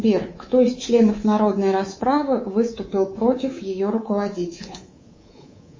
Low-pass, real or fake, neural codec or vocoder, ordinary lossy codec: 7.2 kHz; fake; codec, 24 kHz, 3.1 kbps, DualCodec; MP3, 32 kbps